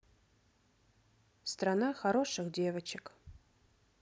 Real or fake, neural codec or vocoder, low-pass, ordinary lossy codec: real; none; none; none